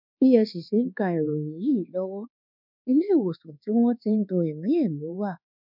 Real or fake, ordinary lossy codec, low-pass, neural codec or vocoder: fake; none; 5.4 kHz; codec, 24 kHz, 1.2 kbps, DualCodec